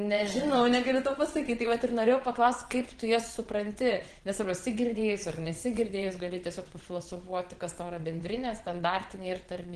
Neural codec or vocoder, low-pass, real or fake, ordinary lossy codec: vocoder, 22.05 kHz, 80 mel bands, WaveNeXt; 9.9 kHz; fake; Opus, 16 kbps